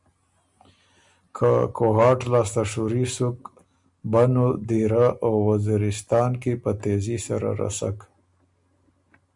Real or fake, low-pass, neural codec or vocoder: real; 10.8 kHz; none